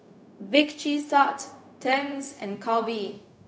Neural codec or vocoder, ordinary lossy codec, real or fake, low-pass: codec, 16 kHz, 0.4 kbps, LongCat-Audio-Codec; none; fake; none